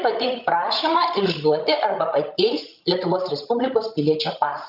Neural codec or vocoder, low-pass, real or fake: codec, 16 kHz, 16 kbps, FreqCodec, larger model; 5.4 kHz; fake